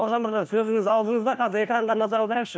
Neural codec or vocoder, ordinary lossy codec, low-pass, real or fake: codec, 16 kHz, 1 kbps, FunCodec, trained on LibriTTS, 50 frames a second; none; none; fake